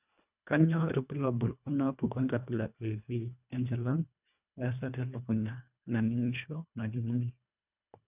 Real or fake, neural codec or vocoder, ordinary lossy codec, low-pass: fake; codec, 24 kHz, 1.5 kbps, HILCodec; none; 3.6 kHz